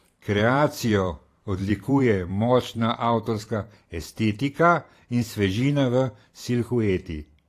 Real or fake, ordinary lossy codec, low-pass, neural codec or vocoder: fake; AAC, 48 kbps; 14.4 kHz; vocoder, 44.1 kHz, 128 mel bands every 512 samples, BigVGAN v2